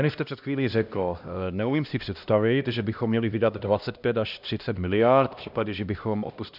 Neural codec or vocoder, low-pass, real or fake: codec, 16 kHz, 1 kbps, X-Codec, HuBERT features, trained on LibriSpeech; 5.4 kHz; fake